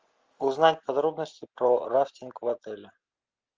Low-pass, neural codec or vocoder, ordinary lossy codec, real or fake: 7.2 kHz; none; Opus, 24 kbps; real